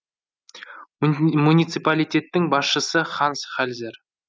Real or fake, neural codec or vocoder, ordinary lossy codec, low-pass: real; none; none; none